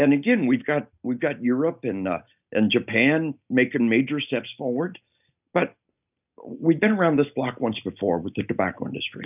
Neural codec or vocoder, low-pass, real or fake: none; 3.6 kHz; real